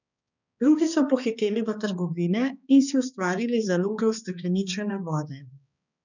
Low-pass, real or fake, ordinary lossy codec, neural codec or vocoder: 7.2 kHz; fake; none; codec, 16 kHz, 2 kbps, X-Codec, HuBERT features, trained on balanced general audio